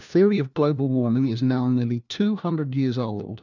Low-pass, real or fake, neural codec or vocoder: 7.2 kHz; fake; codec, 16 kHz, 1 kbps, FunCodec, trained on LibriTTS, 50 frames a second